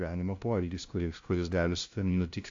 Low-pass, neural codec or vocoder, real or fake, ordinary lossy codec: 7.2 kHz; codec, 16 kHz, 0.5 kbps, FunCodec, trained on LibriTTS, 25 frames a second; fake; AAC, 48 kbps